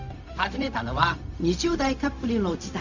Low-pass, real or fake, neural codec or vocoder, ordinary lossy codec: 7.2 kHz; fake; codec, 16 kHz, 0.4 kbps, LongCat-Audio-Codec; none